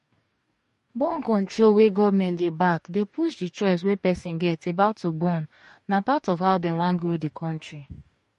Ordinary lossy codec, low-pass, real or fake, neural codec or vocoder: MP3, 48 kbps; 14.4 kHz; fake; codec, 44.1 kHz, 2.6 kbps, DAC